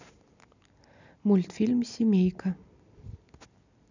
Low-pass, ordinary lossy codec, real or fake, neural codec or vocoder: 7.2 kHz; none; real; none